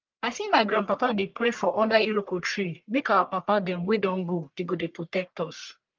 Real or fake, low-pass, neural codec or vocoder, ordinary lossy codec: fake; 7.2 kHz; codec, 44.1 kHz, 1.7 kbps, Pupu-Codec; Opus, 32 kbps